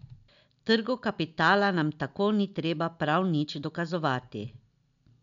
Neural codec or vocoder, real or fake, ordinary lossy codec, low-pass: none; real; none; 7.2 kHz